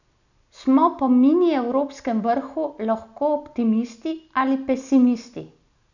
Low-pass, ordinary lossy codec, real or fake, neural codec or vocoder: 7.2 kHz; none; real; none